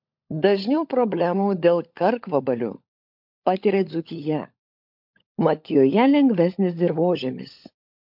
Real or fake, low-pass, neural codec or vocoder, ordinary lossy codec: fake; 5.4 kHz; codec, 16 kHz, 16 kbps, FunCodec, trained on LibriTTS, 50 frames a second; MP3, 48 kbps